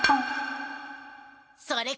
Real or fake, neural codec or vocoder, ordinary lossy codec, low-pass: real; none; none; none